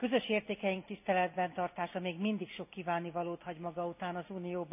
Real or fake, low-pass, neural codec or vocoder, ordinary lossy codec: real; 3.6 kHz; none; none